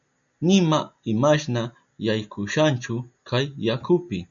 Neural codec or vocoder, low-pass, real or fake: none; 7.2 kHz; real